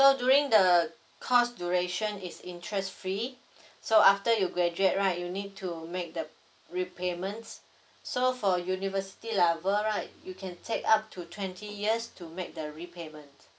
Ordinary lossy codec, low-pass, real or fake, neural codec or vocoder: none; none; real; none